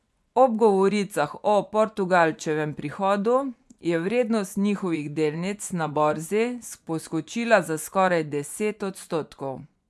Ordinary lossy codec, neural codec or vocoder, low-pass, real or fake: none; vocoder, 24 kHz, 100 mel bands, Vocos; none; fake